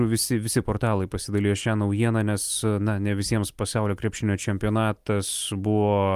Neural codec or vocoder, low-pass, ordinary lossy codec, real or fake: none; 14.4 kHz; Opus, 32 kbps; real